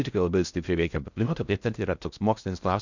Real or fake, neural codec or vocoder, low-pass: fake; codec, 16 kHz in and 24 kHz out, 0.6 kbps, FocalCodec, streaming, 4096 codes; 7.2 kHz